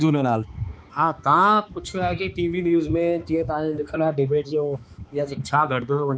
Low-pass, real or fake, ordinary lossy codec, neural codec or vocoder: none; fake; none; codec, 16 kHz, 2 kbps, X-Codec, HuBERT features, trained on balanced general audio